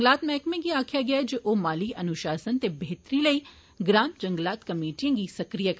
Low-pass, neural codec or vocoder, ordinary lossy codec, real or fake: none; none; none; real